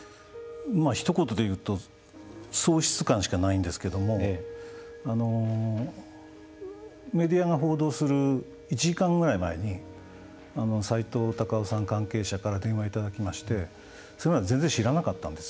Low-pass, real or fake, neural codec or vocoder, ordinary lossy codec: none; real; none; none